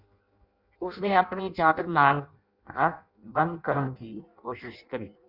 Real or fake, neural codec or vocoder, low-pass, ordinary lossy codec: fake; codec, 16 kHz in and 24 kHz out, 0.6 kbps, FireRedTTS-2 codec; 5.4 kHz; Opus, 64 kbps